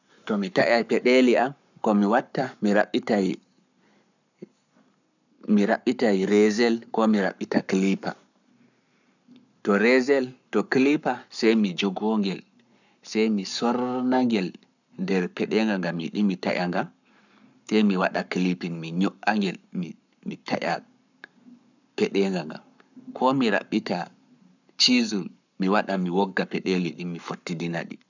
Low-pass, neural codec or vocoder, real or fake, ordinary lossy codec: 7.2 kHz; codec, 44.1 kHz, 7.8 kbps, Pupu-Codec; fake; none